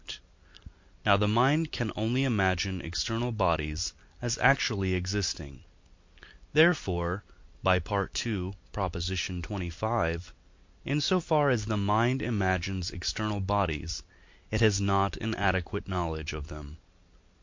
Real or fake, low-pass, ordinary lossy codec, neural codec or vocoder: real; 7.2 kHz; MP3, 48 kbps; none